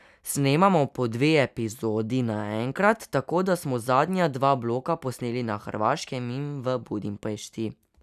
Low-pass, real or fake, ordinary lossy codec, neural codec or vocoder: 14.4 kHz; real; none; none